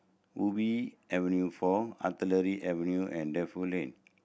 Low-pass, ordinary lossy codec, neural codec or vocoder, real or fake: none; none; none; real